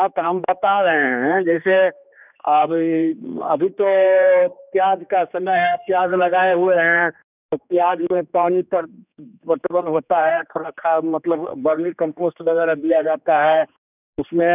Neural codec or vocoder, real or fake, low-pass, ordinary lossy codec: codec, 16 kHz, 2 kbps, X-Codec, HuBERT features, trained on general audio; fake; 3.6 kHz; none